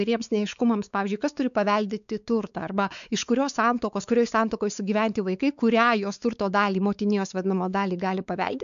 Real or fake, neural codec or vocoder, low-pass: fake; codec, 16 kHz, 4 kbps, X-Codec, WavLM features, trained on Multilingual LibriSpeech; 7.2 kHz